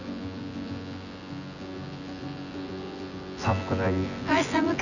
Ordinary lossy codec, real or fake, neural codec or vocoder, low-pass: none; fake; vocoder, 24 kHz, 100 mel bands, Vocos; 7.2 kHz